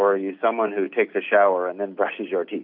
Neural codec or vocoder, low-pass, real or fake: none; 5.4 kHz; real